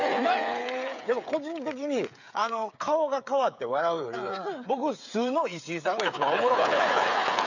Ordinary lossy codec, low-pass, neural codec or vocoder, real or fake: none; 7.2 kHz; codec, 16 kHz, 8 kbps, FreqCodec, smaller model; fake